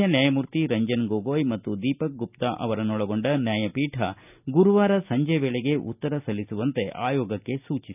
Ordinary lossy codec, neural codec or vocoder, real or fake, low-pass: none; none; real; 3.6 kHz